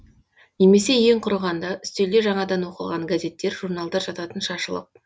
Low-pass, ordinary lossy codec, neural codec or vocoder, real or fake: none; none; none; real